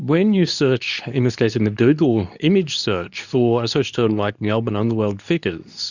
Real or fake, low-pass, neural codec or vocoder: fake; 7.2 kHz; codec, 24 kHz, 0.9 kbps, WavTokenizer, medium speech release version 2